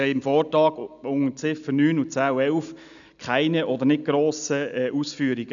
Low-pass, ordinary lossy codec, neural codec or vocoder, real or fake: 7.2 kHz; none; none; real